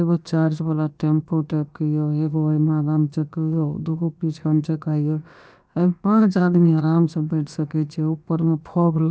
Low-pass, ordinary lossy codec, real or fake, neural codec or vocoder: none; none; fake; codec, 16 kHz, about 1 kbps, DyCAST, with the encoder's durations